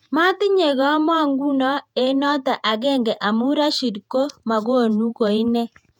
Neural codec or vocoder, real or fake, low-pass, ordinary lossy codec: vocoder, 48 kHz, 128 mel bands, Vocos; fake; 19.8 kHz; none